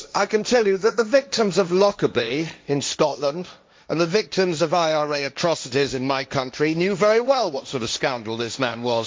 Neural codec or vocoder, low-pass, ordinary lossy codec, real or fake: codec, 16 kHz, 1.1 kbps, Voila-Tokenizer; none; none; fake